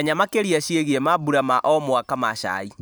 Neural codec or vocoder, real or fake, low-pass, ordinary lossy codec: vocoder, 44.1 kHz, 128 mel bands every 512 samples, BigVGAN v2; fake; none; none